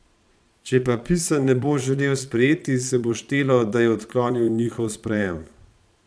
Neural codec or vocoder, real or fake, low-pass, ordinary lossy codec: vocoder, 22.05 kHz, 80 mel bands, Vocos; fake; none; none